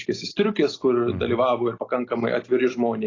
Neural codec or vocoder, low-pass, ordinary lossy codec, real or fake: none; 7.2 kHz; AAC, 32 kbps; real